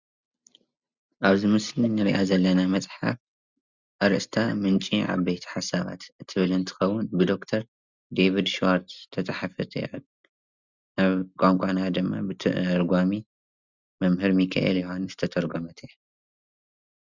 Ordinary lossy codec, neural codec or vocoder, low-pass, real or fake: Opus, 64 kbps; none; 7.2 kHz; real